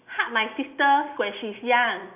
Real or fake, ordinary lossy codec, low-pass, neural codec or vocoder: real; none; 3.6 kHz; none